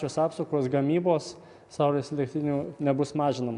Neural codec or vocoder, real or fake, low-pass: none; real; 10.8 kHz